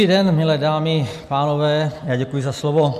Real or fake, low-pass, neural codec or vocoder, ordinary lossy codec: real; 14.4 kHz; none; MP3, 96 kbps